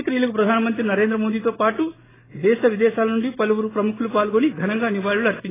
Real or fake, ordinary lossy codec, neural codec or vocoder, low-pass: real; AAC, 16 kbps; none; 3.6 kHz